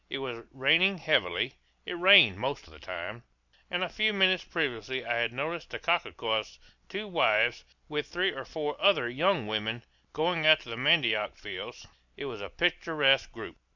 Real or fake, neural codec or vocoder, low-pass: real; none; 7.2 kHz